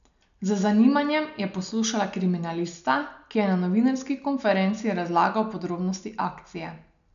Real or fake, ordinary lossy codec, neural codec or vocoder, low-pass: real; none; none; 7.2 kHz